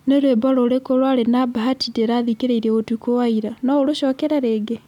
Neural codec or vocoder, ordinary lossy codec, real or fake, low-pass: none; none; real; 19.8 kHz